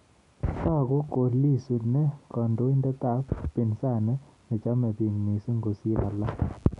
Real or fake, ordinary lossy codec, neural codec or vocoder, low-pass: real; none; none; 10.8 kHz